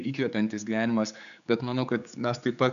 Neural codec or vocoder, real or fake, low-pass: codec, 16 kHz, 4 kbps, X-Codec, HuBERT features, trained on general audio; fake; 7.2 kHz